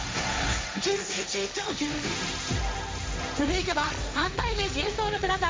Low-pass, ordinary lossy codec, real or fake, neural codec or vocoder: none; none; fake; codec, 16 kHz, 1.1 kbps, Voila-Tokenizer